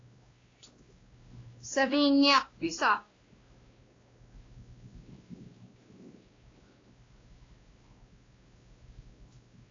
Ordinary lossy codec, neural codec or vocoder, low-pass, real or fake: AAC, 32 kbps; codec, 16 kHz, 1 kbps, X-Codec, WavLM features, trained on Multilingual LibriSpeech; 7.2 kHz; fake